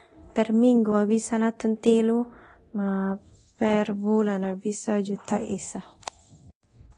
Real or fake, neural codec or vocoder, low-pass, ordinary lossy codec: fake; codec, 24 kHz, 0.9 kbps, DualCodec; 10.8 kHz; AAC, 32 kbps